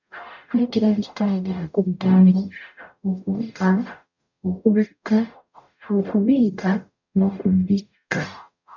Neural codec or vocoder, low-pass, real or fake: codec, 44.1 kHz, 0.9 kbps, DAC; 7.2 kHz; fake